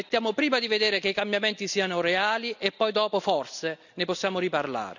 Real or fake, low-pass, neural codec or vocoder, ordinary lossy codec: real; 7.2 kHz; none; none